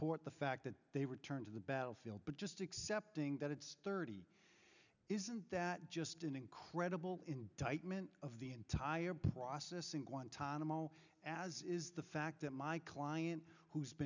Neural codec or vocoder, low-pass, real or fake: none; 7.2 kHz; real